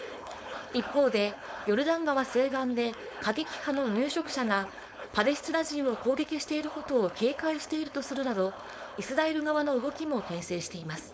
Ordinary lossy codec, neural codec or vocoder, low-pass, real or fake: none; codec, 16 kHz, 4.8 kbps, FACodec; none; fake